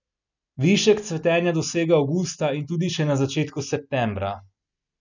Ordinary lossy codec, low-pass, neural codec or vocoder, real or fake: none; 7.2 kHz; none; real